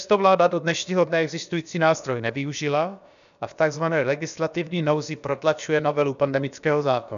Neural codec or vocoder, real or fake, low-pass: codec, 16 kHz, about 1 kbps, DyCAST, with the encoder's durations; fake; 7.2 kHz